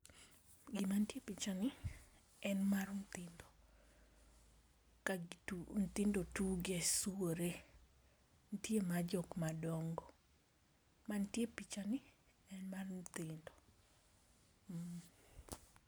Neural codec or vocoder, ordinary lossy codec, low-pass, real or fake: none; none; none; real